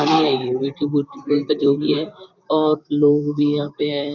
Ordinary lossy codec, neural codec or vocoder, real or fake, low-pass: none; vocoder, 44.1 kHz, 128 mel bands, Pupu-Vocoder; fake; 7.2 kHz